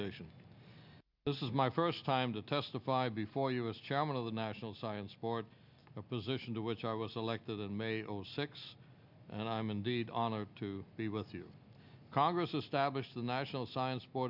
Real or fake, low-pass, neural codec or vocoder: real; 5.4 kHz; none